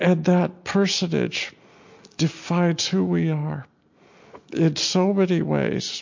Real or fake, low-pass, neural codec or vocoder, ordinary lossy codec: real; 7.2 kHz; none; MP3, 48 kbps